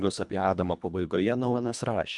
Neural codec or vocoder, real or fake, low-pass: codec, 24 kHz, 1.5 kbps, HILCodec; fake; 10.8 kHz